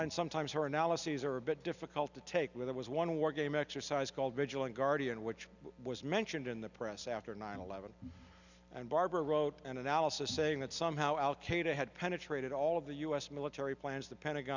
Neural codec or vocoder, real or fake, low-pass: none; real; 7.2 kHz